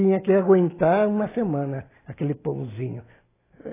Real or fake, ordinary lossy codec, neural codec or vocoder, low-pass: real; AAC, 16 kbps; none; 3.6 kHz